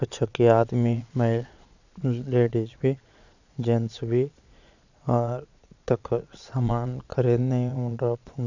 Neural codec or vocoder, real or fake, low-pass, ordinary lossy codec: vocoder, 22.05 kHz, 80 mel bands, Vocos; fake; 7.2 kHz; none